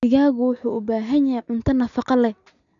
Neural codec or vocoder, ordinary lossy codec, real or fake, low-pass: none; none; real; 7.2 kHz